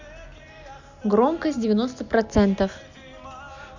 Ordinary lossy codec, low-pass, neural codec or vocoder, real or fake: none; 7.2 kHz; none; real